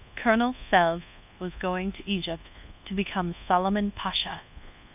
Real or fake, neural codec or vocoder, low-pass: fake; codec, 24 kHz, 1.2 kbps, DualCodec; 3.6 kHz